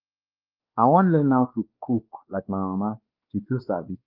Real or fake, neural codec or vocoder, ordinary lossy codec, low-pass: fake; codec, 16 kHz, 2 kbps, X-Codec, WavLM features, trained on Multilingual LibriSpeech; Opus, 24 kbps; 5.4 kHz